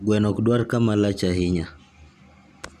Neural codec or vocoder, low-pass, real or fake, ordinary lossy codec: none; 14.4 kHz; real; none